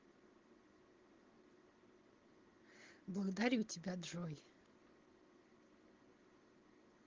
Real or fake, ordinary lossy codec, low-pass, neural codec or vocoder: real; Opus, 16 kbps; 7.2 kHz; none